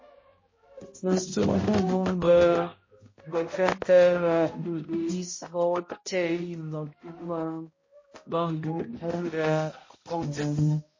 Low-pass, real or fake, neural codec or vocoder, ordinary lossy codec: 7.2 kHz; fake; codec, 16 kHz, 0.5 kbps, X-Codec, HuBERT features, trained on balanced general audio; MP3, 32 kbps